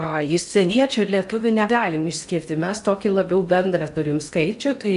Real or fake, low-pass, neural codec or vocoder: fake; 10.8 kHz; codec, 16 kHz in and 24 kHz out, 0.6 kbps, FocalCodec, streaming, 4096 codes